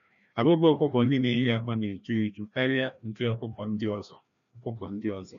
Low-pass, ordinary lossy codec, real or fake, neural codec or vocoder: 7.2 kHz; none; fake; codec, 16 kHz, 1 kbps, FreqCodec, larger model